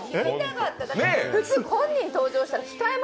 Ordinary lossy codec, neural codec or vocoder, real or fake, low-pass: none; none; real; none